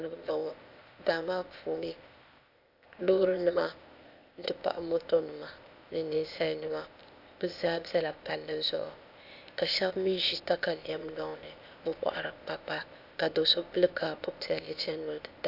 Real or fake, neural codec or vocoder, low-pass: fake; codec, 16 kHz, 0.8 kbps, ZipCodec; 5.4 kHz